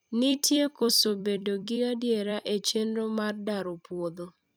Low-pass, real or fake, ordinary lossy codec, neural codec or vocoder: none; fake; none; vocoder, 44.1 kHz, 128 mel bands every 256 samples, BigVGAN v2